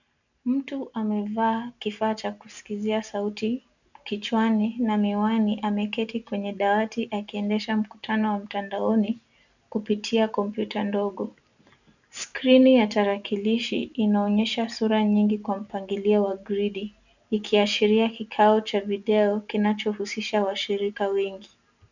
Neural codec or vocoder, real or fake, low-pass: none; real; 7.2 kHz